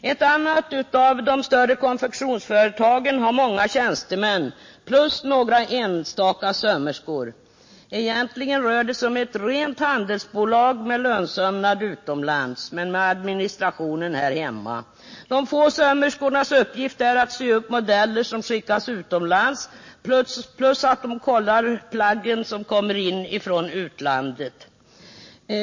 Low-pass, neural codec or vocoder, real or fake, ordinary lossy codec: 7.2 kHz; none; real; MP3, 32 kbps